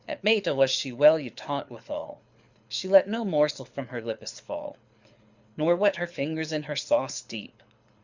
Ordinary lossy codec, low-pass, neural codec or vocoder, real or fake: Opus, 64 kbps; 7.2 kHz; codec, 24 kHz, 6 kbps, HILCodec; fake